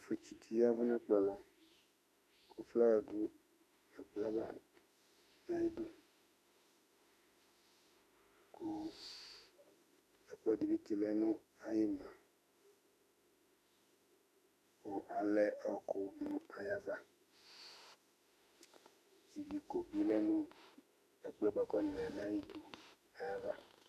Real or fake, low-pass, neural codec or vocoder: fake; 14.4 kHz; autoencoder, 48 kHz, 32 numbers a frame, DAC-VAE, trained on Japanese speech